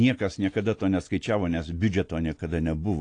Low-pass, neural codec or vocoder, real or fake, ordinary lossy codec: 9.9 kHz; none; real; AAC, 48 kbps